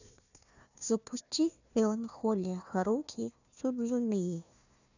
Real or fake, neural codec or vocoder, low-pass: fake; codec, 16 kHz, 1 kbps, FunCodec, trained on Chinese and English, 50 frames a second; 7.2 kHz